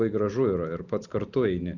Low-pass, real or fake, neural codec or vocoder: 7.2 kHz; real; none